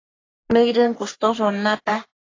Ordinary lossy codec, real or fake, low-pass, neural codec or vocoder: AAC, 32 kbps; fake; 7.2 kHz; codec, 44.1 kHz, 3.4 kbps, Pupu-Codec